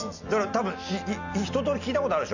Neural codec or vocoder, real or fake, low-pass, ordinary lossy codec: none; real; 7.2 kHz; none